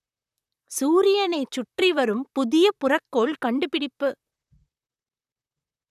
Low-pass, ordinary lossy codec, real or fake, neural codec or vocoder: 14.4 kHz; none; fake; vocoder, 44.1 kHz, 128 mel bands, Pupu-Vocoder